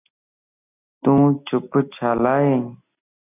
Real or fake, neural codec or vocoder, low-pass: real; none; 3.6 kHz